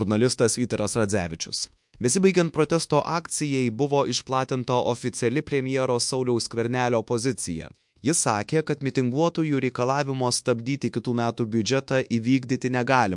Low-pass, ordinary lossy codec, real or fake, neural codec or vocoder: 10.8 kHz; MP3, 64 kbps; fake; codec, 24 kHz, 1.2 kbps, DualCodec